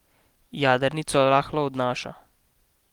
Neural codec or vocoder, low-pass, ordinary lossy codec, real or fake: none; 19.8 kHz; Opus, 24 kbps; real